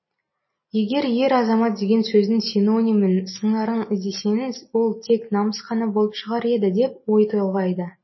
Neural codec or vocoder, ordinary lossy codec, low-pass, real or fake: none; MP3, 24 kbps; 7.2 kHz; real